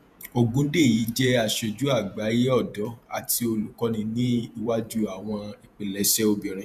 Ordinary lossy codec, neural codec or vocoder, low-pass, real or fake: none; vocoder, 48 kHz, 128 mel bands, Vocos; 14.4 kHz; fake